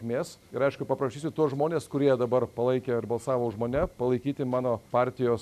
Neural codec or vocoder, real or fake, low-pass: none; real; 14.4 kHz